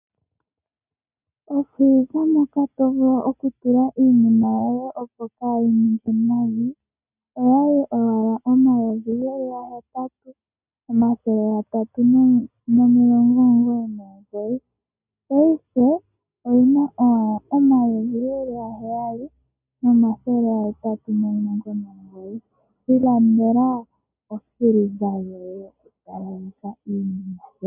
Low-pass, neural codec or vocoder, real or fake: 3.6 kHz; none; real